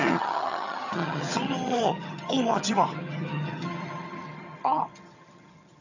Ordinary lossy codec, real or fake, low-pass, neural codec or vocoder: none; fake; 7.2 kHz; vocoder, 22.05 kHz, 80 mel bands, HiFi-GAN